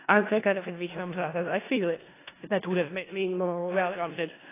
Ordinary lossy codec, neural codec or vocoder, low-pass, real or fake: AAC, 16 kbps; codec, 16 kHz in and 24 kHz out, 0.4 kbps, LongCat-Audio-Codec, four codebook decoder; 3.6 kHz; fake